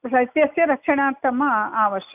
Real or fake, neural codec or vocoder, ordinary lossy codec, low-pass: real; none; none; 3.6 kHz